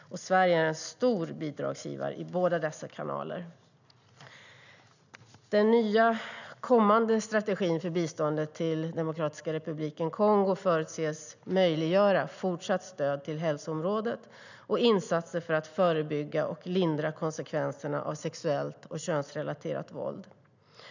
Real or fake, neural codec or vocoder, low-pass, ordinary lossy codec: real; none; 7.2 kHz; none